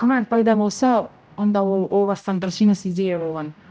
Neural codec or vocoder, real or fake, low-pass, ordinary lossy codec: codec, 16 kHz, 0.5 kbps, X-Codec, HuBERT features, trained on general audio; fake; none; none